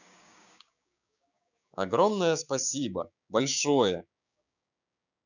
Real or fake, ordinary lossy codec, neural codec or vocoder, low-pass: fake; none; codec, 16 kHz, 6 kbps, DAC; 7.2 kHz